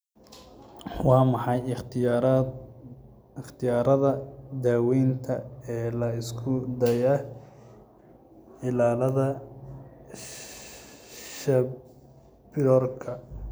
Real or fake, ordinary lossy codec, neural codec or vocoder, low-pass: real; none; none; none